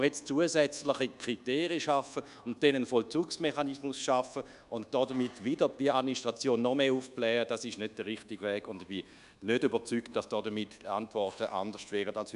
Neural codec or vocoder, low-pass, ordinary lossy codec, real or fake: codec, 24 kHz, 1.2 kbps, DualCodec; 10.8 kHz; none; fake